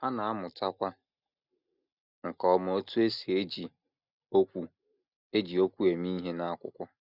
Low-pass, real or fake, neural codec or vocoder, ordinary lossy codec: 5.4 kHz; real; none; none